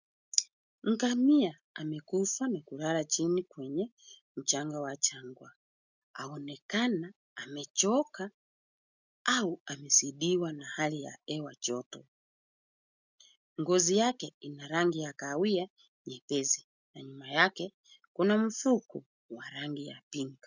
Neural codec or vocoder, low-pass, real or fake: none; 7.2 kHz; real